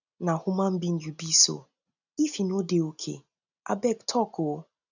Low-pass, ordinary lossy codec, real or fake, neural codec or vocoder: 7.2 kHz; none; real; none